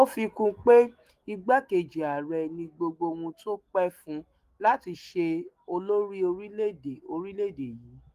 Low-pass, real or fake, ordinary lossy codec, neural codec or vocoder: 14.4 kHz; fake; Opus, 24 kbps; autoencoder, 48 kHz, 128 numbers a frame, DAC-VAE, trained on Japanese speech